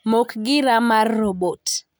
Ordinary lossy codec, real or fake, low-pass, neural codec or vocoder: none; real; none; none